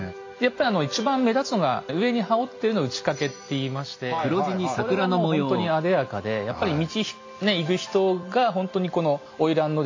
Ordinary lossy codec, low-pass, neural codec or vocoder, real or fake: AAC, 48 kbps; 7.2 kHz; none; real